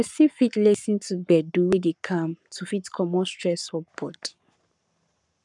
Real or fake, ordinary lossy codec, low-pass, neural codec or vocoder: fake; none; 10.8 kHz; codec, 44.1 kHz, 7.8 kbps, Pupu-Codec